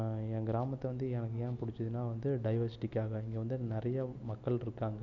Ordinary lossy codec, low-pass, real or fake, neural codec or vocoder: none; 7.2 kHz; real; none